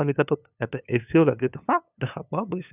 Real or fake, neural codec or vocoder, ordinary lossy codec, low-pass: fake; codec, 16 kHz, 2 kbps, FunCodec, trained on LibriTTS, 25 frames a second; none; 3.6 kHz